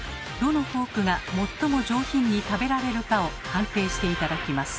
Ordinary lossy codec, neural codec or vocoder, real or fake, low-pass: none; none; real; none